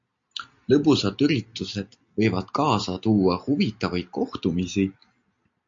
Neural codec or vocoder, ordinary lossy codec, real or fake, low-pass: none; MP3, 48 kbps; real; 7.2 kHz